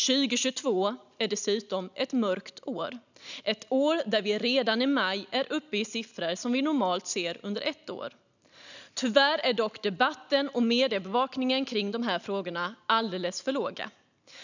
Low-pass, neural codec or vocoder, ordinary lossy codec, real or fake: 7.2 kHz; none; none; real